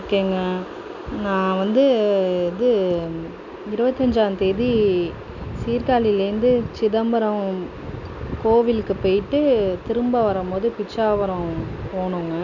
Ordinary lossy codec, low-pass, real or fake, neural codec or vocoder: none; 7.2 kHz; real; none